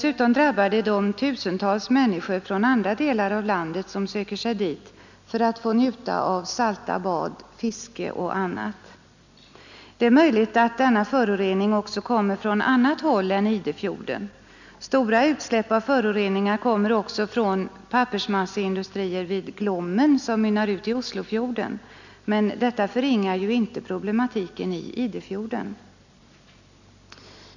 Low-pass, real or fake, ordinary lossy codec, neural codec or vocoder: 7.2 kHz; real; none; none